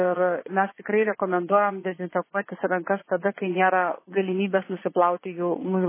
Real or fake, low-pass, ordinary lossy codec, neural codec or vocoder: fake; 3.6 kHz; MP3, 16 kbps; vocoder, 22.05 kHz, 80 mel bands, Vocos